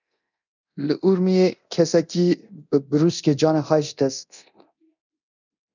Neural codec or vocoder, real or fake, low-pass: codec, 24 kHz, 0.9 kbps, DualCodec; fake; 7.2 kHz